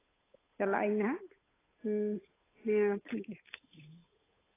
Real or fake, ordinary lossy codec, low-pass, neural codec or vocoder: fake; AAC, 16 kbps; 3.6 kHz; codec, 16 kHz, 8 kbps, FunCodec, trained on Chinese and English, 25 frames a second